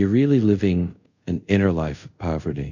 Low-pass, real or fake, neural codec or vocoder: 7.2 kHz; fake; codec, 24 kHz, 0.5 kbps, DualCodec